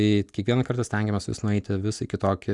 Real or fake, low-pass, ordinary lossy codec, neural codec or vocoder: real; 10.8 kHz; Opus, 64 kbps; none